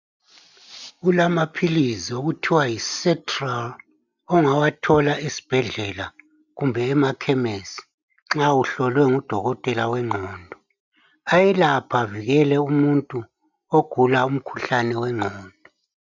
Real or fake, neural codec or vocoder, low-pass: real; none; 7.2 kHz